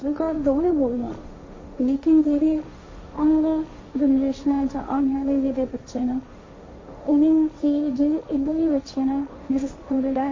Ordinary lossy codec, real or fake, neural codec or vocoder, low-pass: MP3, 32 kbps; fake; codec, 16 kHz, 1.1 kbps, Voila-Tokenizer; 7.2 kHz